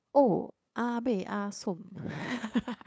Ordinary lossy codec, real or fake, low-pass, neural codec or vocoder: none; fake; none; codec, 16 kHz, 8 kbps, FunCodec, trained on LibriTTS, 25 frames a second